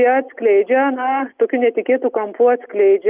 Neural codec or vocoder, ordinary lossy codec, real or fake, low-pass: none; Opus, 24 kbps; real; 3.6 kHz